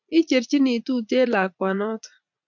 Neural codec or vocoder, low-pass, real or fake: vocoder, 44.1 kHz, 80 mel bands, Vocos; 7.2 kHz; fake